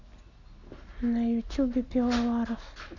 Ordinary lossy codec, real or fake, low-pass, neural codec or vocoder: none; real; 7.2 kHz; none